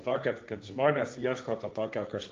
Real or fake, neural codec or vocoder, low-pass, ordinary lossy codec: fake; codec, 16 kHz, 1.1 kbps, Voila-Tokenizer; 7.2 kHz; Opus, 24 kbps